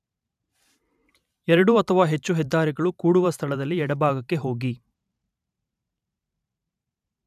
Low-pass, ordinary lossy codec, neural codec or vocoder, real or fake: 14.4 kHz; none; vocoder, 44.1 kHz, 128 mel bands every 512 samples, BigVGAN v2; fake